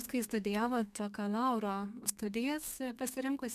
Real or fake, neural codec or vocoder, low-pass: fake; codec, 32 kHz, 1.9 kbps, SNAC; 14.4 kHz